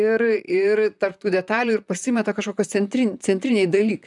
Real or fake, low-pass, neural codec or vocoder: fake; 10.8 kHz; vocoder, 44.1 kHz, 128 mel bands, Pupu-Vocoder